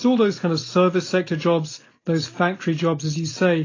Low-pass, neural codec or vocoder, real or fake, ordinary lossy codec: 7.2 kHz; none; real; AAC, 32 kbps